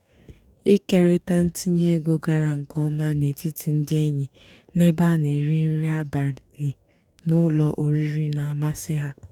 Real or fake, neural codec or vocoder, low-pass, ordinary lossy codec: fake; codec, 44.1 kHz, 2.6 kbps, DAC; 19.8 kHz; none